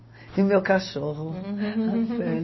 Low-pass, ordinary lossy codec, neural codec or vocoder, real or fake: 7.2 kHz; MP3, 24 kbps; none; real